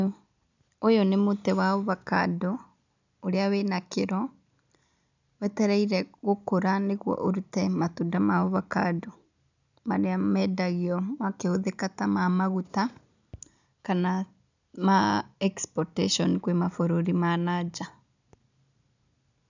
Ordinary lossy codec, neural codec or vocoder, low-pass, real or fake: none; none; 7.2 kHz; real